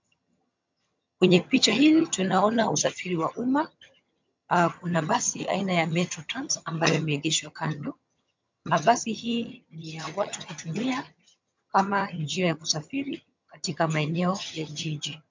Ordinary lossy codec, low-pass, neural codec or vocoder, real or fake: MP3, 64 kbps; 7.2 kHz; vocoder, 22.05 kHz, 80 mel bands, HiFi-GAN; fake